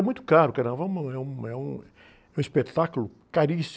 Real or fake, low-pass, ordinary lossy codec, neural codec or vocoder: real; none; none; none